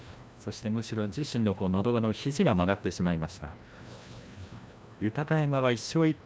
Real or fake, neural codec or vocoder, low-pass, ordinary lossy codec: fake; codec, 16 kHz, 1 kbps, FreqCodec, larger model; none; none